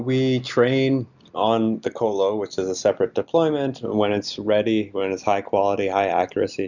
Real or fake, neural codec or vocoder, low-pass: real; none; 7.2 kHz